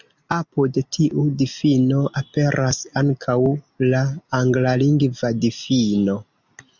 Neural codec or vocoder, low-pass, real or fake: none; 7.2 kHz; real